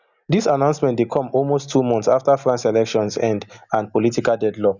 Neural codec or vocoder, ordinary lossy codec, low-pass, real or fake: none; none; 7.2 kHz; real